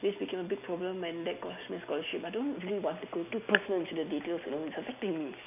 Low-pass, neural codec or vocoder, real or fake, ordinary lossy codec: 3.6 kHz; none; real; none